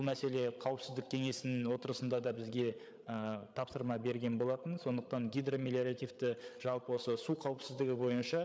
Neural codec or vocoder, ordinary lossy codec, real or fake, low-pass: codec, 16 kHz, 8 kbps, FreqCodec, larger model; none; fake; none